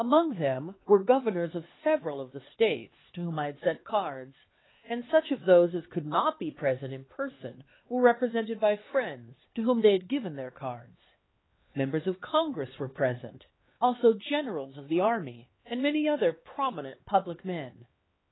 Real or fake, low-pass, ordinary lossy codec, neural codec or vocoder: fake; 7.2 kHz; AAC, 16 kbps; codec, 16 kHz, 4 kbps, X-Codec, HuBERT features, trained on LibriSpeech